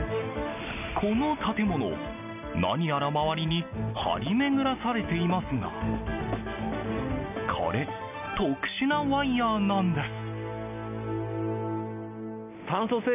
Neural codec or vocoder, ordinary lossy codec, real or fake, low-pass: none; none; real; 3.6 kHz